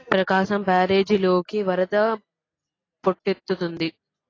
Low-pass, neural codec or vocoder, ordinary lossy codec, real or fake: 7.2 kHz; none; AAC, 32 kbps; real